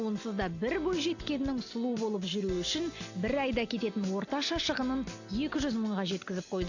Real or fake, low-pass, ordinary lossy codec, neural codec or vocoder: real; 7.2 kHz; AAC, 48 kbps; none